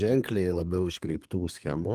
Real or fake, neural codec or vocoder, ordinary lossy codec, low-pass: fake; codec, 32 kHz, 1.9 kbps, SNAC; Opus, 32 kbps; 14.4 kHz